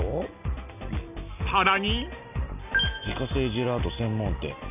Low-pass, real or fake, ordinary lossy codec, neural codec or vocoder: 3.6 kHz; real; none; none